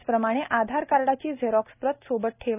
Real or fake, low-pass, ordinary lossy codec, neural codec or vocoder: real; 3.6 kHz; none; none